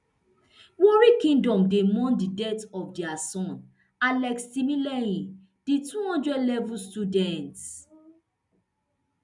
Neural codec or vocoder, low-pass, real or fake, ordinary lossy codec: none; 10.8 kHz; real; none